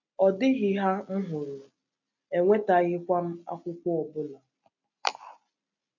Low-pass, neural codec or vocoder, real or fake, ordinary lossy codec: 7.2 kHz; none; real; none